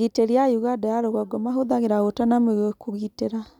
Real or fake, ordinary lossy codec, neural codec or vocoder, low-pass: real; none; none; 19.8 kHz